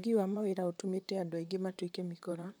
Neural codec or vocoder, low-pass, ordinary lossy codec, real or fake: vocoder, 44.1 kHz, 128 mel bands, Pupu-Vocoder; none; none; fake